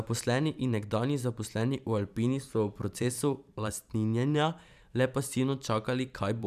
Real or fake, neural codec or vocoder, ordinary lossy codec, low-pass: fake; vocoder, 44.1 kHz, 128 mel bands every 512 samples, BigVGAN v2; none; 14.4 kHz